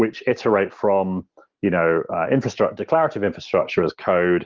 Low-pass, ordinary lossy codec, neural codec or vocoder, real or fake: 7.2 kHz; Opus, 16 kbps; none; real